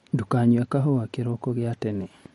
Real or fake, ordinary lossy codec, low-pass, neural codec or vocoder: real; MP3, 48 kbps; 19.8 kHz; none